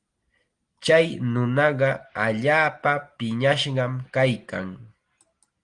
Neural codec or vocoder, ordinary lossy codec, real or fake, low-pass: none; Opus, 24 kbps; real; 9.9 kHz